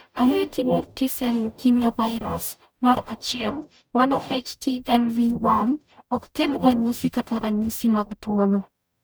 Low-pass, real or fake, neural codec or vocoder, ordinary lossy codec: none; fake; codec, 44.1 kHz, 0.9 kbps, DAC; none